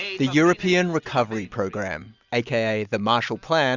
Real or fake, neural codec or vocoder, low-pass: real; none; 7.2 kHz